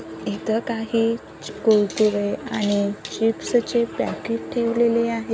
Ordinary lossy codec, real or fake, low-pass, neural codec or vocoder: none; real; none; none